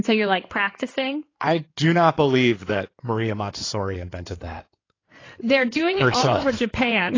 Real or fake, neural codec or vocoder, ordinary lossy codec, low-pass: fake; codec, 16 kHz in and 24 kHz out, 2.2 kbps, FireRedTTS-2 codec; AAC, 32 kbps; 7.2 kHz